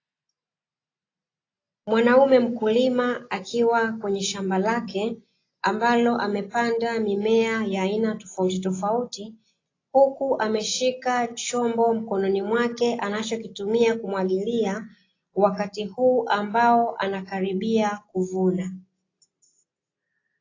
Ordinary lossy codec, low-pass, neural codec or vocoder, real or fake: AAC, 32 kbps; 7.2 kHz; none; real